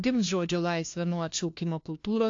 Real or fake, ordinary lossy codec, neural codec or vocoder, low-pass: fake; AAC, 48 kbps; codec, 16 kHz, 1 kbps, FunCodec, trained on LibriTTS, 50 frames a second; 7.2 kHz